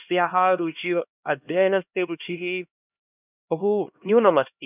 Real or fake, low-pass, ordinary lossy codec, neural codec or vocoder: fake; 3.6 kHz; none; codec, 16 kHz, 1 kbps, X-Codec, HuBERT features, trained on LibriSpeech